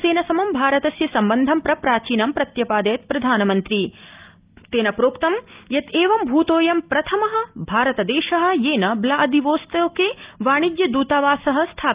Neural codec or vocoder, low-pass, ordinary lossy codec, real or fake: none; 3.6 kHz; Opus, 32 kbps; real